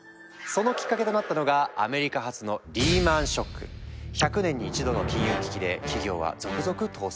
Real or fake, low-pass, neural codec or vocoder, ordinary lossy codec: real; none; none; none